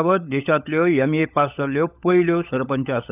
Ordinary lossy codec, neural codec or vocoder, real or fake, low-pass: none; codec, 16 kHz, 16 kbps, FunCodec, trained on LibriTTS, 50 frames a second; fake; 3.6 kHz